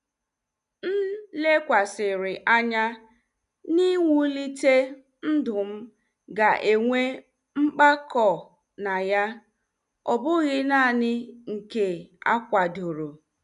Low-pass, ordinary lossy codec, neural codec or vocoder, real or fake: 10.8 kHz; none; none; real